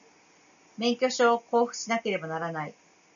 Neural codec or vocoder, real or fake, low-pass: none; real; 7.2 kHz